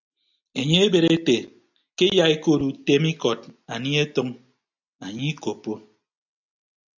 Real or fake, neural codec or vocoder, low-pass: real; none; 7.2 kHz